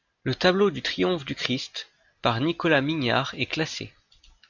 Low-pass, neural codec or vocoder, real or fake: 7.2 kHz; none; real